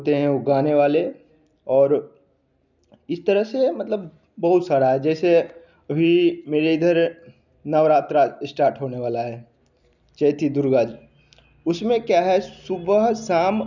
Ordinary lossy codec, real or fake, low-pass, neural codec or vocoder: none; real; 7.2 kHz; none